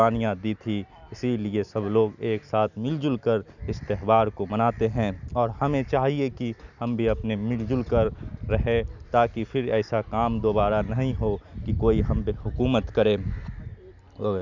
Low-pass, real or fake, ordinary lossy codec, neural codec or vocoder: 7.2 kHz; real; none; none